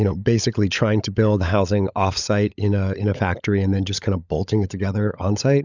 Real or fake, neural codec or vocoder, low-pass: fake; codec, 16 kHz, 16 kbps, FunCodec, trained on LibriTTS, 50 frames a second; 7.2 kHz